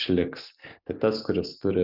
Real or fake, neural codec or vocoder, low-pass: real; none; 5.4 kHz